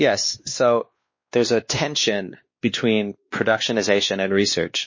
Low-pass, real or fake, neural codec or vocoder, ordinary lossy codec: 7.2 kHz; fake; codec, 16 kHz, 2 kbps, X-Codec, WavLM features, trained on Multilingual LibriSpeech; MP3, 32 kbps